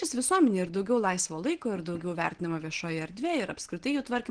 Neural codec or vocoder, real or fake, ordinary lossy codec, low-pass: none; real; Opus, 16 kbps; 9.9 kHz